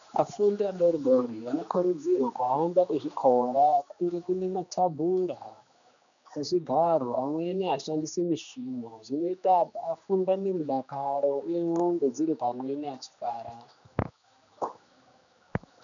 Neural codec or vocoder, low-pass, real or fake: codec, 16 kHz, 2 kbps, X-Codec, HuBERT features, trained on general audio; 7.2 kHz; fake